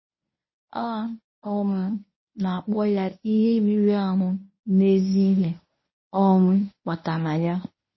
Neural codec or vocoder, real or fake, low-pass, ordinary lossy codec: codec, 24 kHz, 0.9 kbps, WavTokenizer, medium speech release version 1; fake; 7.2 kHz; MP3, 24 kbps